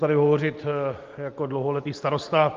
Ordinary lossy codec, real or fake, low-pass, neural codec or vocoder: Opus, 32 kbps; real; 7.2 kHz; none